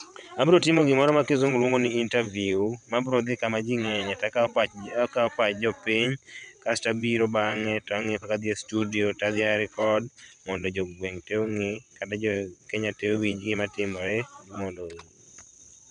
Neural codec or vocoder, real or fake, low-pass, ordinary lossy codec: vocoder, 22.05 kHz, 80 mel bands, WaveNeXt; fake; 9.9 kHz; none